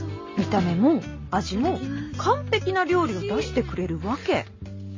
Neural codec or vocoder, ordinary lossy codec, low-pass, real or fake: none; MP3, 32 kbps; 7.2 kHz; real